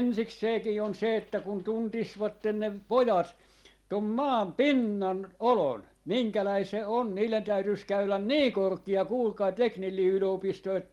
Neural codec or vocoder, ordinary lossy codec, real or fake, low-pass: none; Opus, 24 kbps; real; 19.8 kHz